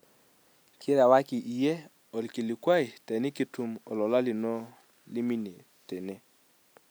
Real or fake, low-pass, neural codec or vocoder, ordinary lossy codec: real; none; none; none